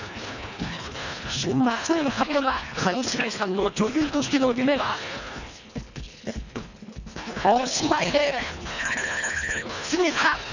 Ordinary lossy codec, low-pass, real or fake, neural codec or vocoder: none; 7.2 kHz; fake; codec, 24 kHz, 1.5 kbps, HILCodec